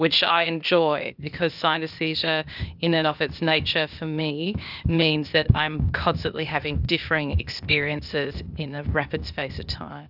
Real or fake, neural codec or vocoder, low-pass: fake; codec, 16 kHz, 0.8 kbps, ZipCodec; 5.4 kHz